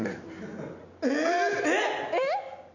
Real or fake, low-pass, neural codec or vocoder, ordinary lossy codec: real; 7.2 kHz; none; none